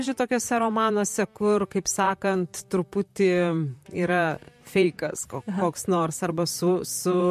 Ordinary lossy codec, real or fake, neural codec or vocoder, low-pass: MP3, 64 kbps; fake; vocoder, 44.1 kHz, 128 mel bands, Pupu-Vocoder; 14.4 kHz